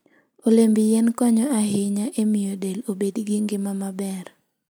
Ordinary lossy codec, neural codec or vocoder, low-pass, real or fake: none; none; none; real